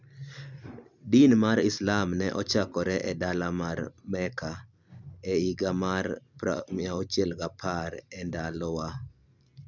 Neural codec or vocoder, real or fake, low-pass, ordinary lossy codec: none; real; 7.2 kHz; none